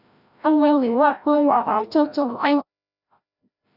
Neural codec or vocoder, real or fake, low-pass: codec, 16 kHz, 0.5 kbps, FreqCodec, larger model; fake; 5.4 kHz